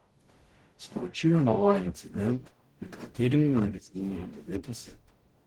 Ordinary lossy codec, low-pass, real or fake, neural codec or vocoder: Opus, 16 kbps; 19.8 kHz; fake; codec, 44.1 kHz, 0.9 kbps, DAC